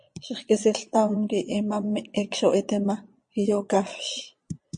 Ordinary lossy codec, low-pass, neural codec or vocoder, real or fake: MP3, 48 kbps; 9.9 kHz; vocoder, 22.05 kHz, 80 mel bands, Vocos; fake